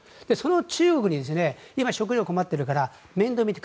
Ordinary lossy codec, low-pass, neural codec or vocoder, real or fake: none; none; none; real